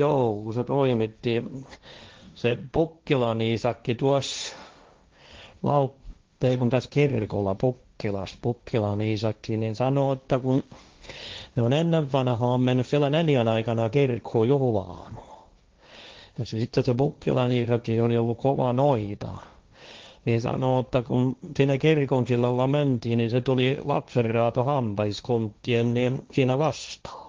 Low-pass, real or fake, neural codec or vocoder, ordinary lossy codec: 7.2 kHz; fake; codec, 16 kHz, 1.1 kbps, Voila-Tokenizer; Opus, 24 kbps